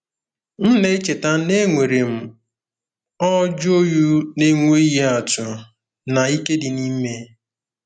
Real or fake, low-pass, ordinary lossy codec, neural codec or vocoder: real; 9.9 kHz; none; none